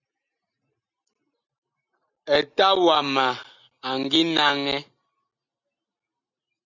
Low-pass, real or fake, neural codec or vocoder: 7.2 kHz; real; none